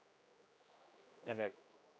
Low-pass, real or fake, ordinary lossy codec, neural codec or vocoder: none; fake; none; codec, 16 kHz, 1 kbps, X-Codec, HuBERT features, trained on general audio